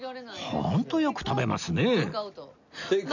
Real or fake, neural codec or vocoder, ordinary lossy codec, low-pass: real; none; none; 7.2 kHz